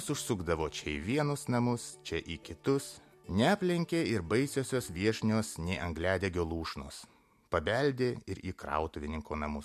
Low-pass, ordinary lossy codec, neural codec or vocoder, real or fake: 14.4 kHz; MP3, 64 kbps; none; real